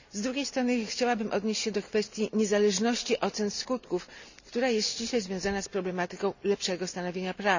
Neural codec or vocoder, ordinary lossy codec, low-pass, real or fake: none; none; 7.2 kHz; real